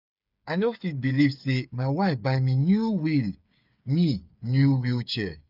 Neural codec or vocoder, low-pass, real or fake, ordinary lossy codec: codec, 16 kHz, 8 kbps, FreqCodec, smaller model; 5.4 kHz; fake; none